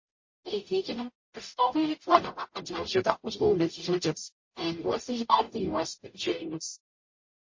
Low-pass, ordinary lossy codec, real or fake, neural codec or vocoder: 7.2 kHz; MP3, 32 kbps; fake; codec, 44.1 kHz, 0.9 kbps, DAC